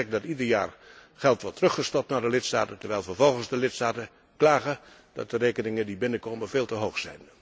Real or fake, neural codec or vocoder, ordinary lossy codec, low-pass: real; none; none; none